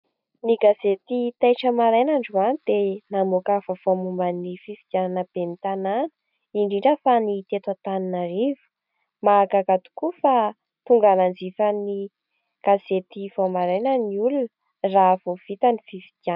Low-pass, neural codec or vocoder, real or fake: 5.4 kHz; none; real